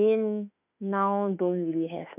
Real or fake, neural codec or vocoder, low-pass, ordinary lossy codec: fake; autoencoder, 48 kHz, 32 numbers a frame, DAC-VAE, trained on Japanese speech; 3.6 kHz; none